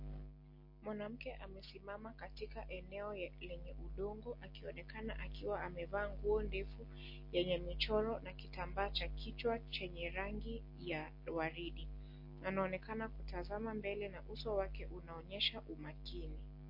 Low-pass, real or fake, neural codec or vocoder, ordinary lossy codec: 5.4 kHz; real; none; MP3, 32 kbps